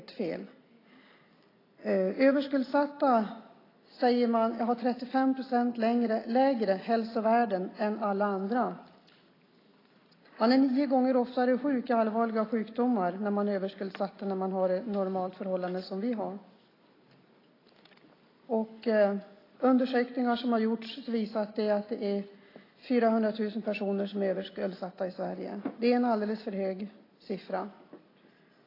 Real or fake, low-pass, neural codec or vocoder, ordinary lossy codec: real; 5.4 kHz; none; AAC, 24 kbps